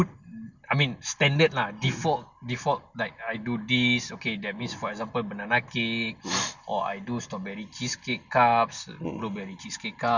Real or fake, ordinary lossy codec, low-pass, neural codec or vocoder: real; none; 7.2 kHz; none